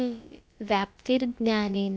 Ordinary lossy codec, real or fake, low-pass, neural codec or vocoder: none; fake; none; codec, 16 kHz, about 1 kbps, DyCAST, with the encoder's durations